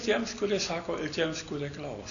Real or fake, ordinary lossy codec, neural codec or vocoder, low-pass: real; MP3, 96 kbps; none; 7.2 kHz